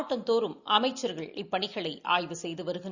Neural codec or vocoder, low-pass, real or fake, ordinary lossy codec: none; 7.2 kHz; real; Opus, 64 kbps